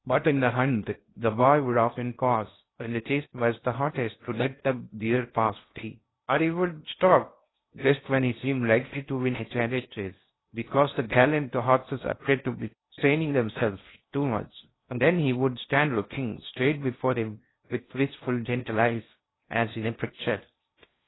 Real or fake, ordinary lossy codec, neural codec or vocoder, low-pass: fake; AAC, 16 kbps; codec, 16 kHz in and 24 kHz out, 0.6 kbps, FocalCodec, streaming, 2048 codes; 7.2 kHz